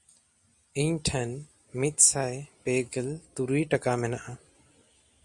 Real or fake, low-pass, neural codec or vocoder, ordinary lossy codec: real; 10.8 kHz; none; Opus, 64 kbps